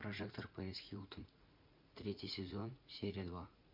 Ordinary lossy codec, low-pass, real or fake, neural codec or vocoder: MP3, 48 kbps; 5.4 kHz; fake; vocoder, 44.1 kHz, 80 mel bands, Vocos